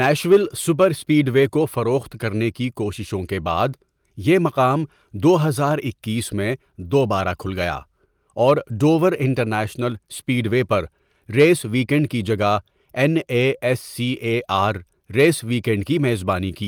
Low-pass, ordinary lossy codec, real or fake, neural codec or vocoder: 19.8 kHz; Opus, 32 kbps; real; none